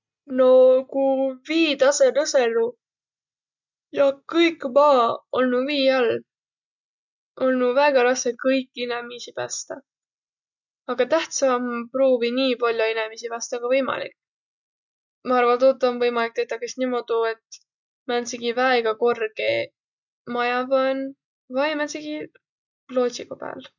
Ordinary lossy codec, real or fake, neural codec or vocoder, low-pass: none; real; none; 7.2 kHz